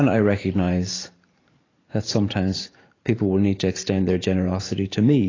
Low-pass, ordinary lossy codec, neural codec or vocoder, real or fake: 7.2 kHz; AAC, 32 kbps; vocoder, 44.1 kHz, 128 mel bands every 512 samples, BigVGAN v2; fake